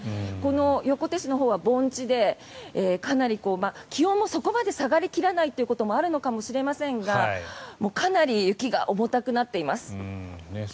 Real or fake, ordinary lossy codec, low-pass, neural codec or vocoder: real; none; none; none